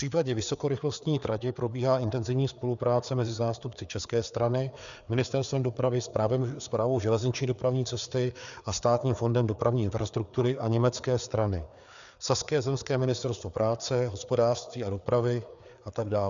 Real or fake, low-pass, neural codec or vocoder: fake; 7.2 kHz; codec, 16 kHz, 4 kbps, FreqCodec, larger model